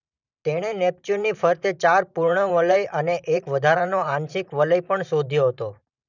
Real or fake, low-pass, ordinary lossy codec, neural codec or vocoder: fake; 7.2 kHz; none; vocoder, 44.1 kHz, 128 mel bands every 512 samples, BigVGAN v2